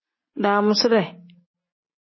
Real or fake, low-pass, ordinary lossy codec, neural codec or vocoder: real; 7.2 kHz; MP3, 24 kbps; none